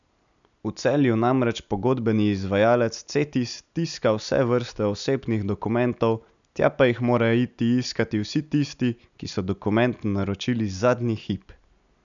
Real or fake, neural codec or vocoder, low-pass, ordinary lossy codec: real; none; 7.2 kHz; none